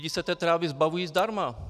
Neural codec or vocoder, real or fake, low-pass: none; real; 14.4 kHz